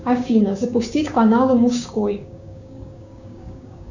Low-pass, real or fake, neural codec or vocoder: 7.2 kHz; fake; codec, 16 kHz, 6 kbps, DAC